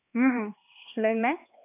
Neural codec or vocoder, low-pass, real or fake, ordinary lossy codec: codec, 16 kHz, 2 kbps, X-Codec, HuBERT features, trained on LibriSpeech; 3.6 kHz; fake; AAC, 24 kbps